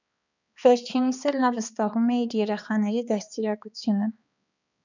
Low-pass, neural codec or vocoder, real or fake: 7.2 kHz; codec, 16 kHz, 2 kbps, X-Codec, HuBERT features, trained on balanced general audio; fake